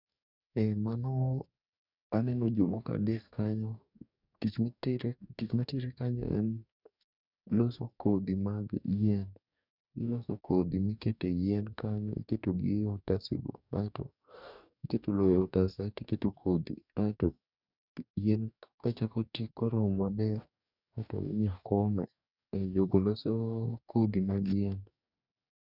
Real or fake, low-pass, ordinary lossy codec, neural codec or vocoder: fake; 5.4 kHz; none; codec, 44.1 kHz, 2.6 kbps, DAC